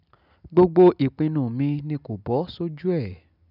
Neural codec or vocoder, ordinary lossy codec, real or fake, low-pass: none; none; real; 5.4 kHz